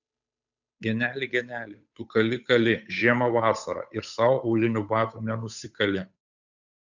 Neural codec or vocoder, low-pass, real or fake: codec, 16 kHz, 8 kbps, FunCodec, trained on Chinese and English, 25 frames a second; 7.2 kHz; fake